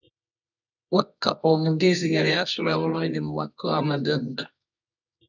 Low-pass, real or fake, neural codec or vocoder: 7.2 kHz; fake; codec, 24 kHz, 0.9 kbps, WavTokenizer, medium music audio release